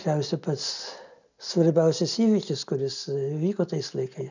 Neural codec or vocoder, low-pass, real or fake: none; 7.2 kHz; real